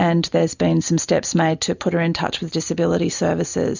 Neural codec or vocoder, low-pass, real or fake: none; 7.2 kHz; real